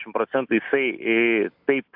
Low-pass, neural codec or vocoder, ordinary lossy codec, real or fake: 5.4 kHz; none; AAC, 48 kbps; real